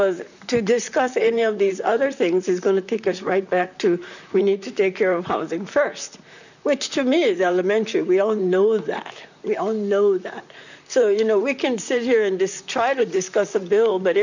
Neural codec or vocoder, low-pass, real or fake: vocoder, 44.1 kHz, 128 mel bands, Pupu-Vocoder; 7.2 kHz; fake